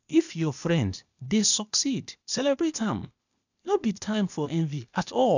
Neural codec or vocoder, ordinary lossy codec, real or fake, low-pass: codec, 16 kHz, 0.8 kbps, ZipCodec; none; fake; 7.2 kHz